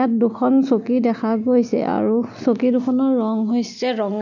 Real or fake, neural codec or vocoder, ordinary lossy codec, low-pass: real; none; none; 7.2 kHz